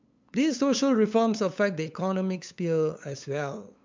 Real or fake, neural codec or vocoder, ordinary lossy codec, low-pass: fake; codec, 16 kHz, 8 kbps, FunCodec, trained on LibriTTS, 25 frames a second; MP3, 64 kbps; 7.2 kHz